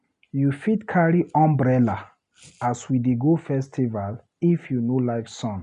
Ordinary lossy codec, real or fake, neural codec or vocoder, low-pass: none; real; none; 10.8 kHz